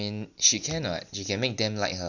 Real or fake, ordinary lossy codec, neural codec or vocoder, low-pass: real; none; none; 7.2 kHz